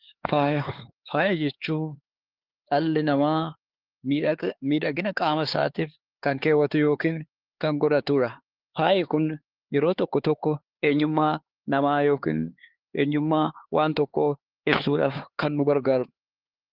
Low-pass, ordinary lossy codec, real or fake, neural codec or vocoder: 5.4 kHz; Opus, 16 kbps; fake; codec, 16 kHz, 4 kbps, X-Codec, HuBERT features, trained on LibriSpeech